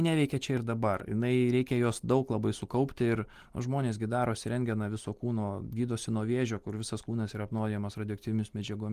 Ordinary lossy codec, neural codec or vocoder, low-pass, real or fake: Opus, 24 kbps; none; 14.4 kHz; real